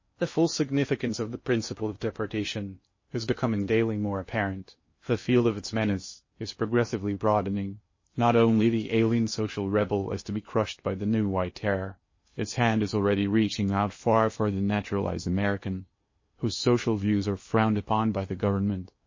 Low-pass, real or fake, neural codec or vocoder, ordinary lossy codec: 7.2 kHz; fake; codec, 16 kHz in and 24 kHz out, 0.8 kbps, FocalCodec, streaming, 65536 codes; MP3, 32 kbps